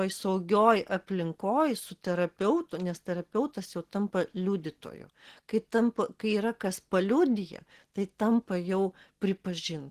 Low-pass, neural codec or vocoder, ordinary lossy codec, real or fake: 14.4 kHz; none; Opus, 16 kbps; real